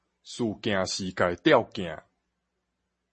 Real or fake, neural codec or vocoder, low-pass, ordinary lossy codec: real; none; 10.8 kHz; MP3, 32 kbps